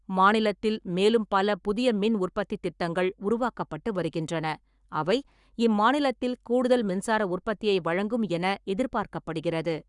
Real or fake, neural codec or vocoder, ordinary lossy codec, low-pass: fake; codec, 44.1 kHz, 7.8 kbps, Pupu-Codec; Opus, 64 kbps; 10.8 kHz